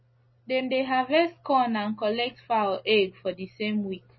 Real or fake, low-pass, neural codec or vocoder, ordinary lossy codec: real; 7.2 kHz; none; MP3, 24 kbps